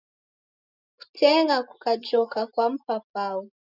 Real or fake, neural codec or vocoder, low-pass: real; none; 5.4 kHz